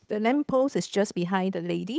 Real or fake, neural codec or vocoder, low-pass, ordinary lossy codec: fake; codec, 16 kHz, 8 kbps, FunCodec, trained on Chinese and English, 25 frames a second; none; none